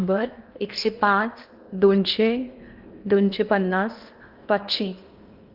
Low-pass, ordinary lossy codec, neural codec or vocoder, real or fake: 5.4 kHz; Opus, 24 kbps; codec, 16 kHz in and 24 kHz out, 0.8 kbps, FocalCodec, streaming, 65536 codes; fake